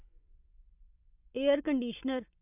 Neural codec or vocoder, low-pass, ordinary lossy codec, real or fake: vocoder, 24 kHz, 100 mel bands, Vocos; 3.6 kHz; none; fake